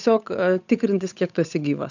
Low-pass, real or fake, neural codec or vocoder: 7.2 kHz; fake; codec, 16 kHz, 8 kbps, FunCodec, trained on Chinese and English, 25 frames a second